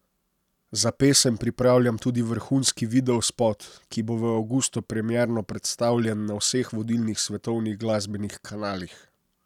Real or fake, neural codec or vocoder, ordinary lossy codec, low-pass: real; none; none; 19.8 kHz